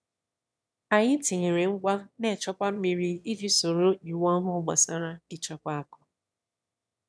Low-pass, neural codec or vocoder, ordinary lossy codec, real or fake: none; autoencoder, 22.05 kHz, a latent of 192 numbers a frame, VITS, trained on one speaker; none; fake